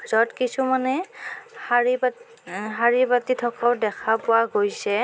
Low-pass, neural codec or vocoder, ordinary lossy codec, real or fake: none; none; none; real